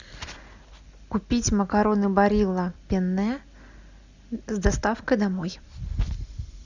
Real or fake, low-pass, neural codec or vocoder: real; 7.2 kHz; none